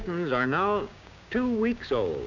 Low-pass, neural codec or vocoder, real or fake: 7.2 kHz; codec, 16 kHz, 6 kbps, DAC; fake